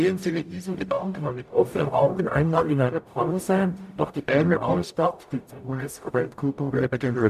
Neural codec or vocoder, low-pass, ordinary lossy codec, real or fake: codec, 44.1 kHz, 0.9 kbps, DAC; 14.4 kHz; none; fake